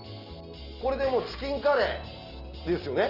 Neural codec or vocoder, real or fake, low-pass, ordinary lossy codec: none; real; 5.4 kHz; Opus, 24 kbps